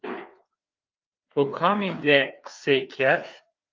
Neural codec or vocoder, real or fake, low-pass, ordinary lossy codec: codec, 16 kHz, 2 kbps, FreqCodec, larger model; fake; 7.2 kHz; Opus, 24 kbps